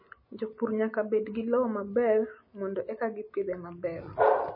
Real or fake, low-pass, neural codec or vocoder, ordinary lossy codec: real; 5.4 kHz; none; MP3, 24 kbps